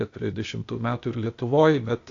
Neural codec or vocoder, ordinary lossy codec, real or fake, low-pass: codec, 16 kHz, 0.8 kbps, ZipCodec; AAC, 48 kbps; fake; 7.2 kHz